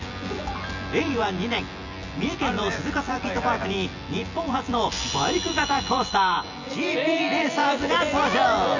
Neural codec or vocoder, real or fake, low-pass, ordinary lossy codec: vocoder, 24 kHz, 100 mel bands, Vocos; fake; 7.2 kHz; none